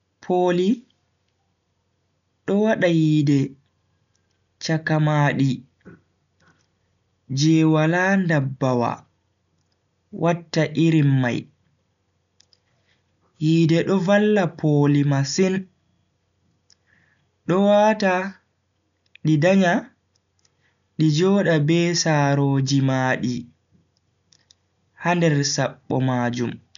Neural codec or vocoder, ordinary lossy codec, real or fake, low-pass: none; none; real; 7.2 kHz